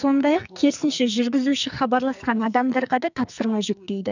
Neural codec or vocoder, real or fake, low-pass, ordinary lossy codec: codec, 44.1 kHz, 2.6 kbps, SNAC; fake; 7.2 kHz; none